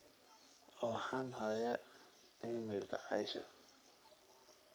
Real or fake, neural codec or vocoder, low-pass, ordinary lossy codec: fake; codec, 44.1 kHz, 3.4 kbps, Pupu-Codec; none; none